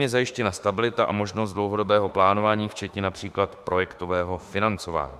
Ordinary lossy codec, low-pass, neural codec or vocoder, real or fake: Opus, 64 kbps; 14.4 kHz; autoencoder, 48 kHz, 32 numbers a frame, DAC-VAE, trained on Japanese speech; fake